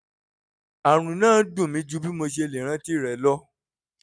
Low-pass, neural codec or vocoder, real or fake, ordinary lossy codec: none; none; real; none